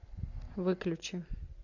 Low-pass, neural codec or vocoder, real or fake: 7.2 kHz; none; real